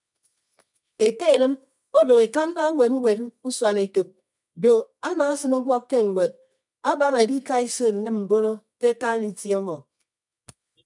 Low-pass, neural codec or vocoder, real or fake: 10.8 kHz; codec, 24 kHz, 0.9 kbps, WavTokenizer, medium music audio release; fake